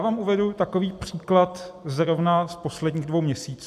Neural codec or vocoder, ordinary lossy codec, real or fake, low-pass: none; AAC, 96 kbps; real; 14.4 kHz